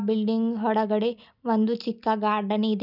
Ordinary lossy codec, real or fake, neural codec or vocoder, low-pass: none; real; none; 5.4 kHz